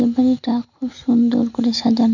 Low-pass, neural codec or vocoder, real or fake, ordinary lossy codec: 7.2 kHz; none; real; none